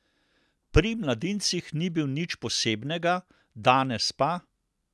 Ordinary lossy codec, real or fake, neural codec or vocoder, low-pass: none; real; none; none